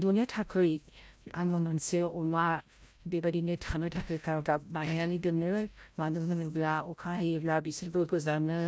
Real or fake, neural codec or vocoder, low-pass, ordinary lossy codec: fake; codec, 16 kHz, 0.5 kbps, FreqCodec, larger model; none; none